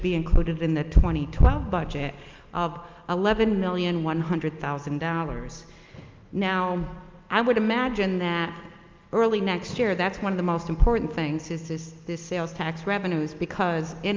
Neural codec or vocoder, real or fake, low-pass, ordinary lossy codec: none; real; 7.2 kHz; Opus, 24 kbps